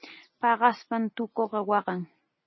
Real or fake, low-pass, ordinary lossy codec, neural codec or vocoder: real; 7.2 kHz; MP3, 24 kbps; none